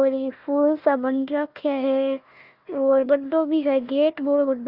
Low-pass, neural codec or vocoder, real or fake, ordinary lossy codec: 5.4 kHz; codec, 16 kHz, 1 kbps, FunCodec, trained on Chinese and English, 50 frames a second; fake; Opus, 32 kbps